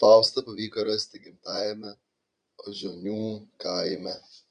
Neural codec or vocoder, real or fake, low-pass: vocoder, 22.05 kHz, 80 mel bands, Vocos; fake; 9.9 kHz